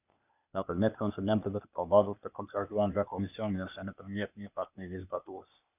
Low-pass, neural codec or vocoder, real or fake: 3.6 kHz; codec, 16 kHz, 0.8 kbps, ZipCodec; fake